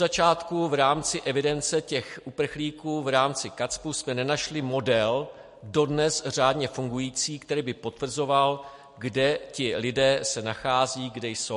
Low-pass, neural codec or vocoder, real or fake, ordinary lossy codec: 14.4 kHz; none; real; MP3, 48 kbps